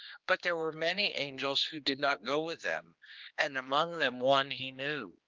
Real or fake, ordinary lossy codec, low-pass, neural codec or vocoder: fake; Opus, 32 kbps; 7.2 kHz; codec, 16 kHz, 2 kbps, X-Codec, HuBERT features, trained on general audio